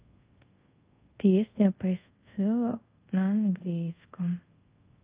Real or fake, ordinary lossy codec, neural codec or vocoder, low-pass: fake; Opus, 24 kbps; codec, 24 kHz, 0.5 kbps, DualCodec; 3.6 kHz